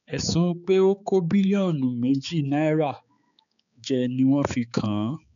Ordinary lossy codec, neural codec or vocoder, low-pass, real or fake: none; codec, 16 kHz, 4 kbps, X-Codec, HuBERT features, trained on balanced general audio; 7.2 kHz; fake